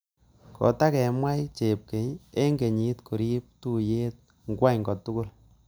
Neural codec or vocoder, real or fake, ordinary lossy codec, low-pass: none; real; none; none